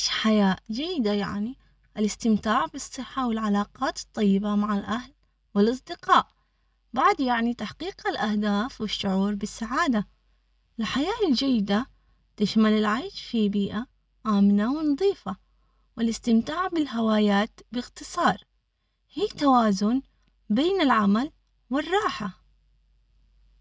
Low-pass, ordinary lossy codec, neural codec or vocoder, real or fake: none; none; none; real